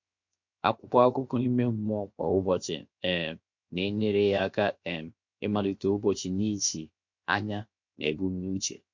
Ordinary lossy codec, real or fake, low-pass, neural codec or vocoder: MP3, 48 kbps; fake; 7.2 kHz; codec, 16 kHz, 0.7 kbps, FocalCodec